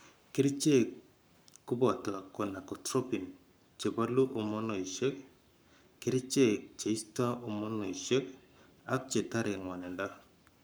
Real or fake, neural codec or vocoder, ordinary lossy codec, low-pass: fake; codec, 44.1 kHz, 7.8 kbps, Pupu-Codec; none; none